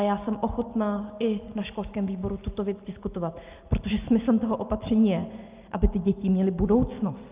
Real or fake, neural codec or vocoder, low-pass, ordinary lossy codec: real; none; 3.6 kHz; Opus, 24 kbps